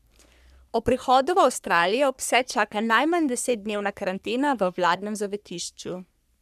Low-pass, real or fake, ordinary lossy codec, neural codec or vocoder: 14.4 kHz; fake; none; codec, 44.1 kHz, 3.4 kbps, Pupu-Codec